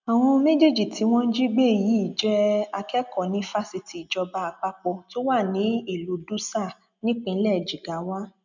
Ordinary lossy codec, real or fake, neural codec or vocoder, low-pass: none; real; none; 7.2 kHz